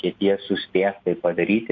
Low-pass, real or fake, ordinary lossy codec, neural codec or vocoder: 7.2 kHz; real; AAC, 48 kbps; none